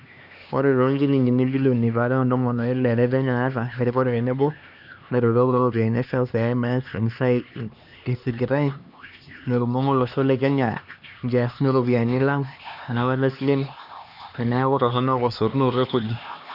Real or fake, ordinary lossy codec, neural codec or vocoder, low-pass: fake; none; codec, 16 kHz, 2 kbps, X-Codec, HuBERT features, trained on LibriSpeech; 5.4 kHz